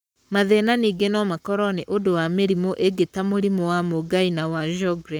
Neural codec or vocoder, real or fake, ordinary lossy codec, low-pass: codec, 44.1 kHz, 7.8 kbps, Pupu-Codec; fake; none; none